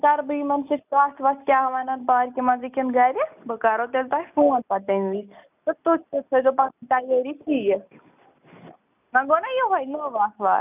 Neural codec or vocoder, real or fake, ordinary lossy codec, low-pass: none; real; none; 3.6 kHz